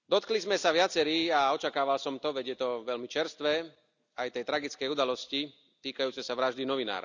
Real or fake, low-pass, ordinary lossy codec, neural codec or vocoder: real; 7.2 kHz; none; none